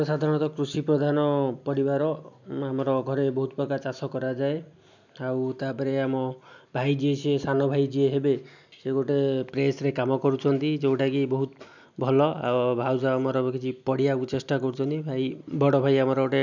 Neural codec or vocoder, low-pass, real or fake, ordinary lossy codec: none; 7.2 kHz; real; none